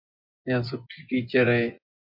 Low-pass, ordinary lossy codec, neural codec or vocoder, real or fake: 5.4 kHz; MP3, 48 kbps; vocoder, 24 kHz, 100 mel bands, Vocos; fake